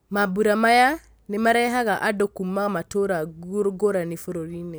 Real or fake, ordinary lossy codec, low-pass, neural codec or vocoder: real; none; none; none